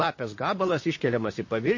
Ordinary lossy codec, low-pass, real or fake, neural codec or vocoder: MP3, 32 kbps; 7.2 kHz; fake; vocoder, 44.1 kHz, 128 mel bands, Pupu-Vocoder